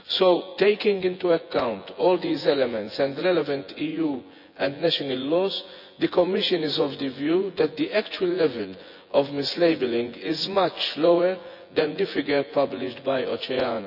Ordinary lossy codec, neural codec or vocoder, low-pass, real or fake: none; vocoder, 24 kHz, 100 mel bands, Vocos; 5.4 kHz; fake